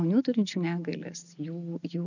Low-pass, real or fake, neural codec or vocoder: 7.2 kHz; real; none